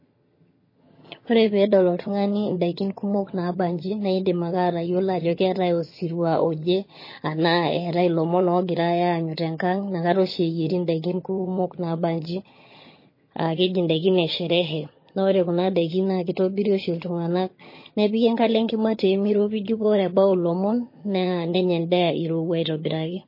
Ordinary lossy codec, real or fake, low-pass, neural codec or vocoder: MP3, 24 kbps; fake; 5.4 kHz; vocoder, 22.05 kHz, 80 mel bands, HiFi-GAN